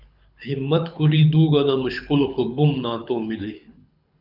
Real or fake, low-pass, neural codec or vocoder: fake; 5.4 kHz; codec, 24 kHz, 6 kbps, HILCodec